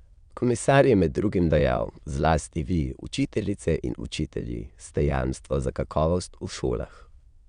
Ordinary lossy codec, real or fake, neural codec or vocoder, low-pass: Opus, 64 kbps; fake; autoencoder, 22.05 kHz, a latent of 192 numbers a frame, VITS, trained on many speakers; 9.9 kHz